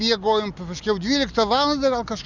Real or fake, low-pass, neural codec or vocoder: real; 7.2 kHz; none